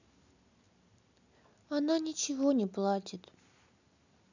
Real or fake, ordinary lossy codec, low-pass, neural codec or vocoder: real; none; 7.2 kHz; none